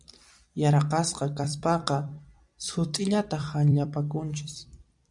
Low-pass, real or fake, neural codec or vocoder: 10.8 kHz; fake; vocoder, 44.1 kHz, 128 mel bands every 256 samples, BigVGAN v2